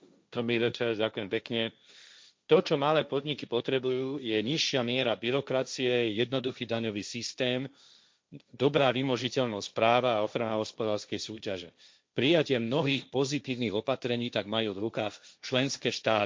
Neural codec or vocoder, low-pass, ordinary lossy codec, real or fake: codec, 16 kHz, 1.1 kbps, Voila-Tokenizer; none; none; fake